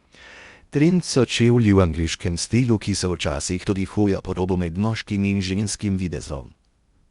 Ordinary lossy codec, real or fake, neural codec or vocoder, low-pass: Opus, 64 kbps; fake; codec, 16 kHz in and 24 kHz out, 0.6 kbps, FocalCodec, streaming, 2048 codes; 10.8 kHz